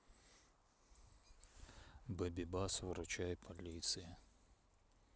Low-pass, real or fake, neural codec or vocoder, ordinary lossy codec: none; real; none; none